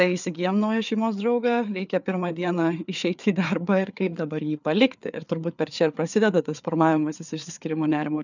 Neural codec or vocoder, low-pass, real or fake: codec, 16 kHz, 4 kbps, FreqCodec, larger model; 7.2 kHz; fake